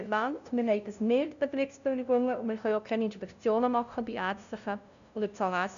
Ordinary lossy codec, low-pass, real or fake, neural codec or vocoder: none; 7.2 kHz; fake; codec, 16 kHz, 0.5 kbps, FunCodec, trained on LibriTTS, 25 frames a second